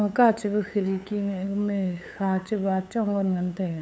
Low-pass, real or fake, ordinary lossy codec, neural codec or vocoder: none; fake; none; codec, 16 kHz, 8 kbps, FunCodec, trained on LibriTTS, 25 frames a second